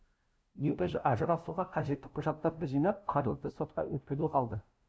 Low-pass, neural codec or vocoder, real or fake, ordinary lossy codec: none; codec, 16 kHz, 0.5 kbps, FunCodec, trained on LibriTTS, 25 frames a second; fake; none